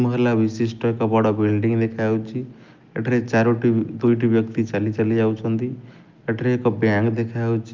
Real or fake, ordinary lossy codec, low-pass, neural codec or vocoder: real; Opus, 32 kbps; 7.2 kHz; none